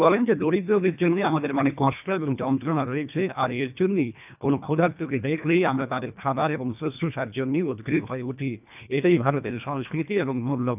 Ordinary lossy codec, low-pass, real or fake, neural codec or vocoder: none; 3.6 kHz; fake; codec, 24 kHz, 1.5 kbps, HILCodec